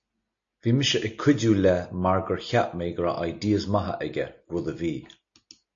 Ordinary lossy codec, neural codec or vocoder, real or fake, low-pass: AAC, 48 kbps; none; real; 7.2 kHz